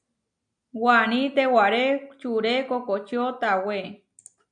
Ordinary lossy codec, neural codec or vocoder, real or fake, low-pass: MP3, 96 kbps; none; real; 9.9 kHz